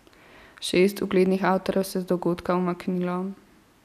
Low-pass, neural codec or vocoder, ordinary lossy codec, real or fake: 14.4 kHz; none; none; real